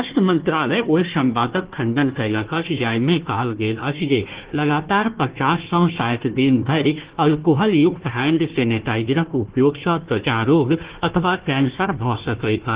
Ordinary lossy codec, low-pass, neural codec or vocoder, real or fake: Opus, 16 kbps; 3.6 kHz; codec, 16 kHz, 1 kbps, FunCodec, trained on Chinese and English, 50 frames a second; fake